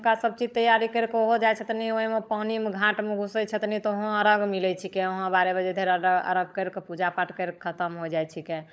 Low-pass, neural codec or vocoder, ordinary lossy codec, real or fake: none; codec, 16 kHz, 16 kbps, FunCodec, trained on LibriTTS, 50 frames a second; none; fake